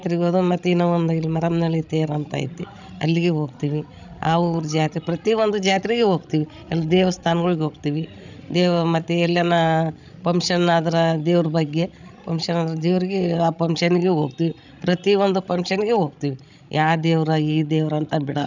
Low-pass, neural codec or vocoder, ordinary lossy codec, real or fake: 7.2 kHz; codec, 16 kHz, 16 kbps, FreqCodec, larger model; none; fake